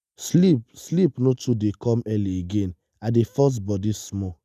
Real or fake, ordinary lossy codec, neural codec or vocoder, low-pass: real; none; none; 14.4 kHz